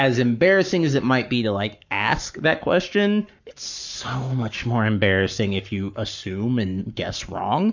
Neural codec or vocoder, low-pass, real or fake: codec, 44.1 kHz, 7.8 kbps, Pupu-Codec; 7.2 kHz; fake